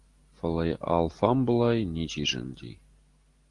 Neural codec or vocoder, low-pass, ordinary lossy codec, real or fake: none; 10.8 kHz; Opus, 24 kbps; real